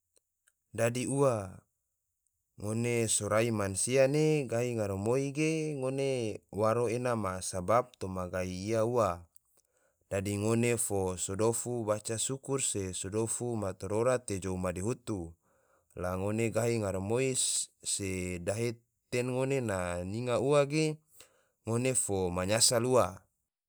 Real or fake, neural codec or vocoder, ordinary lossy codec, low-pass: real; none; none; none